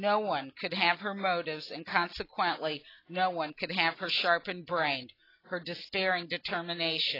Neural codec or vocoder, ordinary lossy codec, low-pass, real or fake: none; AAC, 24 kbps; 5.4 kHz; real